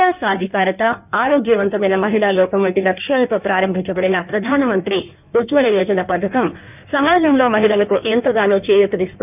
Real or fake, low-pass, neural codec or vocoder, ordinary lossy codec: fake; 3.6 kHz; codec, 16 kHz in and 24 kHz out, 1.1 kbps, FireRedTTS-2 codec; none